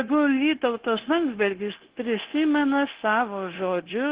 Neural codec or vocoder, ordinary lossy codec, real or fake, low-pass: codec, 16 kHz, 0.9 kbps, LongCat-Audio-Codec; Opus, 16 kbps; fake; 3.6 kHz